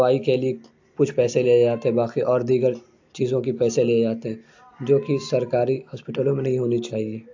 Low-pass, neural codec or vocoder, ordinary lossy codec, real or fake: 7.2 kHz; none; AAC, 48 kbps; real